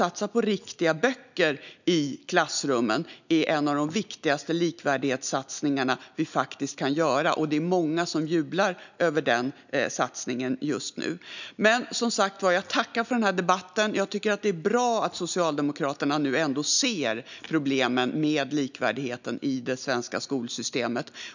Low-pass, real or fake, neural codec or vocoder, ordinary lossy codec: 7.2 kHz; real; none; none